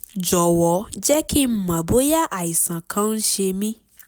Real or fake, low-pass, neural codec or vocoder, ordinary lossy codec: real; none; none; none